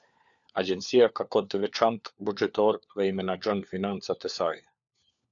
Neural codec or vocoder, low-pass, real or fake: codec, 16 kHz, 4 kbps, FunCodec, trained on LibriTTS, 50 frames a second; 7.2 kHz; fake